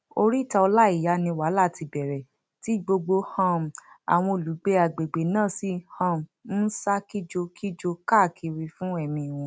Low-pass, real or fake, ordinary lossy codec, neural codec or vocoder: none; real; none; none